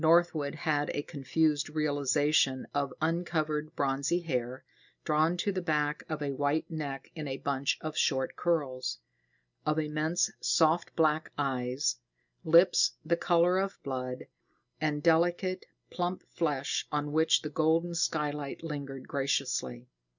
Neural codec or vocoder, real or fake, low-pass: none; real; 7.2 kHz